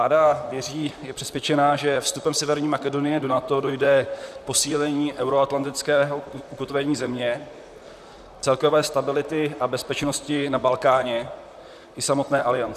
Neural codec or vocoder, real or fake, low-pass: vocoder, 44.1 kHz, 128 mel bands, Pupu-Vocoder; fake; 14.4 kHz